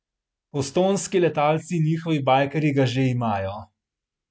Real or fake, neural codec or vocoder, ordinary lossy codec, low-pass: real; none; none; none